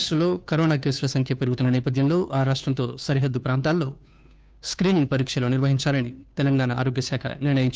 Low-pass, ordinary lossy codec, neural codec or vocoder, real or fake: none; none; codec, 16 kHz, 2 kbps, FunCodec, trained on Chinese and English, 25 frames a second; fake